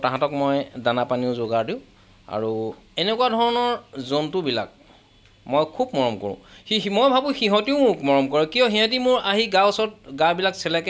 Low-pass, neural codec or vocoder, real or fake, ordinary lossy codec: none; none; real; none